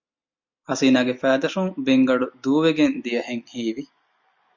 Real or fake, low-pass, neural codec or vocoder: real; 7.2 kHz; none